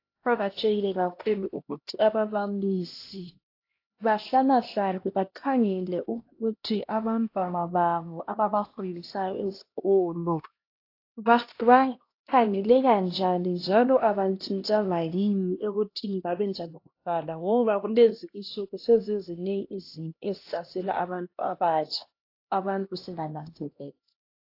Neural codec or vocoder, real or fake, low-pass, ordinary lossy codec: codec, 16 kHz, 1 kbps, X-Codec, HuBERT features, trained on LibriSpeech; fake; 5.4 kHz; AAC, 32 kbps